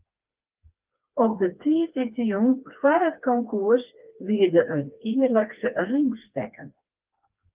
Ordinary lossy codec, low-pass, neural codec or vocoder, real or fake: Opus, 32 kbps; 3.6 kHz; codec, 16 kHz, 2 kbps, FreqCodec, smaller model; fake